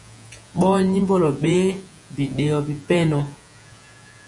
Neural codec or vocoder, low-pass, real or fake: vocoder, 48 kHz, 128 mel bands, Vocos; 10.8 kHz; fake